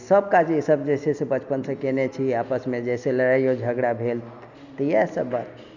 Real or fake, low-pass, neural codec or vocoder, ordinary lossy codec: real; 7.2 kHz; none; none